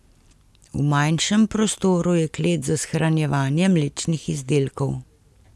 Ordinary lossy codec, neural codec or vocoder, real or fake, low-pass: none; none; real; none